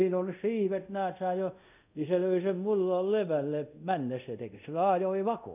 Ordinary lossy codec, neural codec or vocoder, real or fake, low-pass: none; codec, 24 kHz, 0.5 kbps, DualCodec; fake; 3.6 kHz